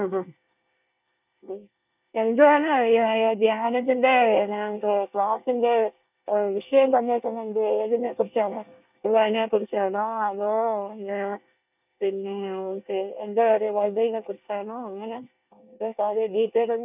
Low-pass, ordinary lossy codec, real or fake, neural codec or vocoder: 3.6 kHz; none; fake; codec, 24 kHz, 1 kbps, SNAC